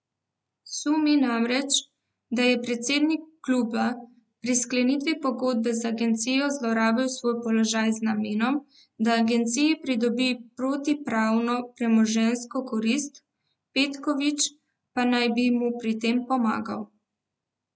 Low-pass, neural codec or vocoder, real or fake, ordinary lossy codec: none; none; real; none